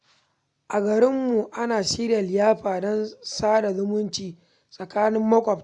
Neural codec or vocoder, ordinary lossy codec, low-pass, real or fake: none; none; 10.8 kHz; real